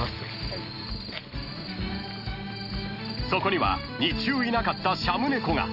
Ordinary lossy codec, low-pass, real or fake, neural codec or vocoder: none; 5.4 kHz; real; none